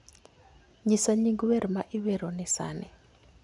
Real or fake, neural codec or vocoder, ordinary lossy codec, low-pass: real; none; none; 10.8 kHz